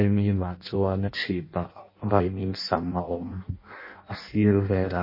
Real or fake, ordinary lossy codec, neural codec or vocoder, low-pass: fake; MP3, 24 kbps; codec, 16 kHz in and 24 kHz out, 0.6 kbps, FireRedTTS-2 codec; 5.4 kHz